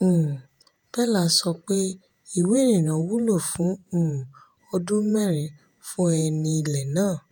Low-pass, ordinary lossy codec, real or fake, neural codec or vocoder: 19.8 kHz; none; fake; vocoder, 48 kHz, 128 mel bands, Vocos